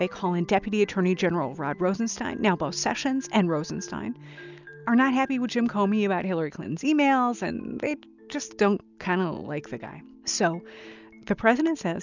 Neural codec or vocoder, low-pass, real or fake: none; 7.2 kHz; real